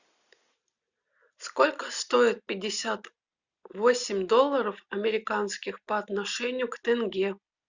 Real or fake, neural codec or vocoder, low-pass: real; none; 7.2 kHz